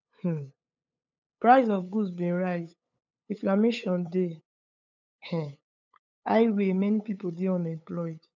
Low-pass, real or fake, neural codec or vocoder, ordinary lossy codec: 7.2 kHz; fake; codec, 16 kHz, 8 kbps, FunCodec, trained on LibriTTS, 25 frames a second; none